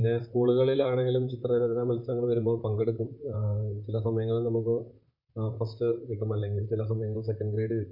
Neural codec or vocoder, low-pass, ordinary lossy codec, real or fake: vocoder, 44.1 kHz, 128 mel bands, Pupu-Vocoder; 5.4 kHz; MP3, 48 kbps; fake